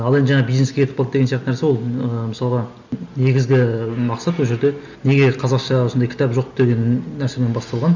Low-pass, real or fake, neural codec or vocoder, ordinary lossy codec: 7.2 kHz; real; none; none